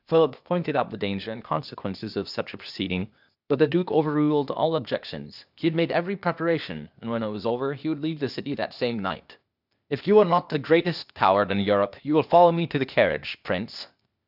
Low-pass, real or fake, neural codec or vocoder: 5.4 kHz; fake; codec, 16 kHz, 0.8 kbps, ZipCodec